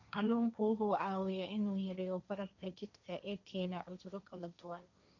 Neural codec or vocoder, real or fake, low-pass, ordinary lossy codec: codec, 16 kHz, 1.1 kbps, Voila-Tokenizer; fake; 7.2 kHz; none